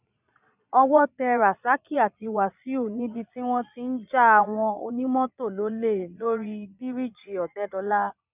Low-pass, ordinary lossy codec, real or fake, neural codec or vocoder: 3.6 kHz; none; fake; vocoder, 22.05 kHz, 80 mel bands, Vocos